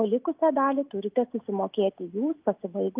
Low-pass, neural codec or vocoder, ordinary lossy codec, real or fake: 3.6 kHz; none; Opus, 32 kbps; real